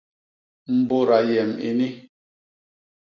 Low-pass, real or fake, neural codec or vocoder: 7.2 kHz; real; none